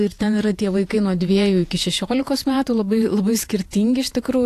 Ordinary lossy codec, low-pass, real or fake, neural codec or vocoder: AAC, 64 kbps; 14.4 kHz; fake; vocoder, 48 kHz, 128 mel bands, Vocos